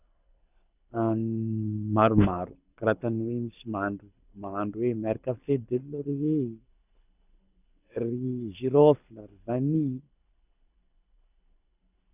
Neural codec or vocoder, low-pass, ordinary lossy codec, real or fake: codec, 24 kHz, 6 kbps, HILCodec; 3.6 kHz; none; fake